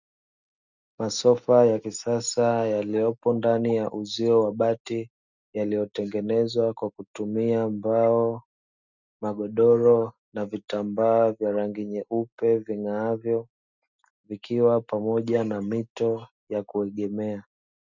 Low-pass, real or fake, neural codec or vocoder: 7.2 kHz; real; none